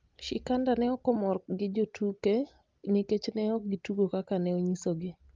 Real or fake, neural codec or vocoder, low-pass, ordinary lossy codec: real; none; 7.2 kHz; Opus, 24 kbps